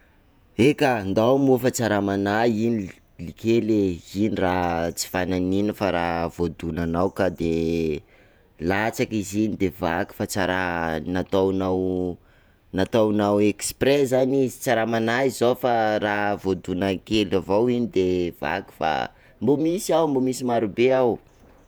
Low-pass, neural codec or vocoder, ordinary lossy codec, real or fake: none; vocoder, 48 kHz, 128 mel bands, Vocos; none; fake